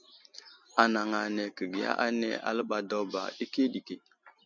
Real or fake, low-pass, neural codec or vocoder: real; 7.2 kHz; none